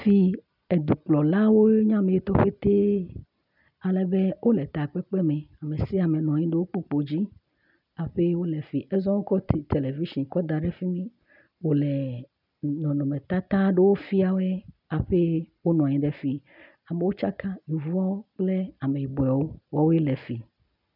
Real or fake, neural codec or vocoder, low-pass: real; none; 5.4 kHz